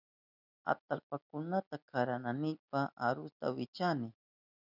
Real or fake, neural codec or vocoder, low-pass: real; none; 5.4 kHz